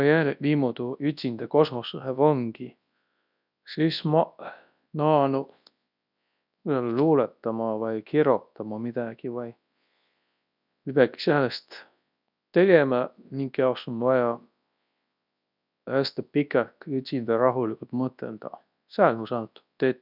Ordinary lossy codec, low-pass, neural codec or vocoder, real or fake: none; 5.4 kHz; codec, 24 kHz, 0.9 kbps, WavTokenizer, large speech release; fake